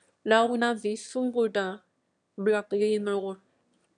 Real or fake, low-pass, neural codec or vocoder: fake; 9.9 kHz; autoencoder, 22.05 kHz, a latent of 192 numbers a frame, VITS, trained on one speaker